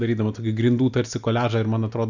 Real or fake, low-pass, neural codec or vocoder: real; 7.2 kHz; none